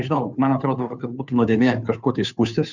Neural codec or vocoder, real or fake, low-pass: codec, 16 kHz, 2 kbps, FunCodec, trained on Chinese and English, 25 frames a second; fake; 7.2 kHz